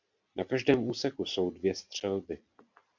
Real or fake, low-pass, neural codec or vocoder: real; 7.2 kHz; none